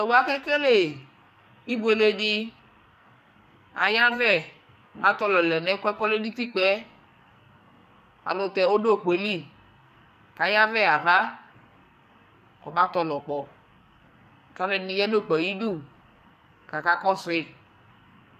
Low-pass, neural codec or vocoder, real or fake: 14.4 kHz; codec, 44.1 kHz, 2.6 kbps, SNAC; fake